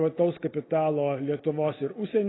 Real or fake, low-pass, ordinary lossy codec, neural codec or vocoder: real; 7.2 kHz; AAC, 16 kbps; none